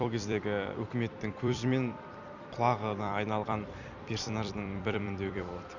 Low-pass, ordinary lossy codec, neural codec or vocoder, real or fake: 7.2 kHz; none; vocoder, 44.1 kHz, 128 mel bands every 256 samples, BigVGAN v2; fake